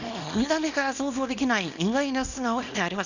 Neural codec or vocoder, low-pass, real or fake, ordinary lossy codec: codec, 24 kHz, 0.9 kbps, WavTokenizer, small release; 7.2 kHz; fake; none